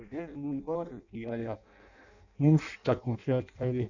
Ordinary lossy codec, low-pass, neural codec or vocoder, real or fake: none; 7.2 kHz; codec, 16 kHz in and 24 kHz out, 0.6 kbps, FireRedTTS-2 codec; fake